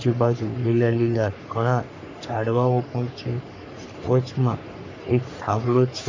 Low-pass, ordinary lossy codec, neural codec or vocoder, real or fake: 7.2 kHz; AAC, 48 kbps; codec, 44.1 kHz, 3.4 kbps, Pupu-Codec; fake